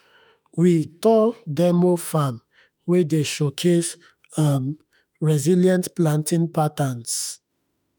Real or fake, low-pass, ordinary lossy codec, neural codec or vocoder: fake; none; none; autoencoder, 48 kHz, 32 numbers a frame, DAC-VAE, trained on Japanese speech